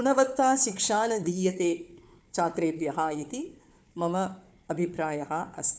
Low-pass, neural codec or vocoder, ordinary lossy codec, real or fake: none; codec, 16 kHz, 4 kbps, FunCodec, trained on Chinese and English, 50 frames a second; none; fake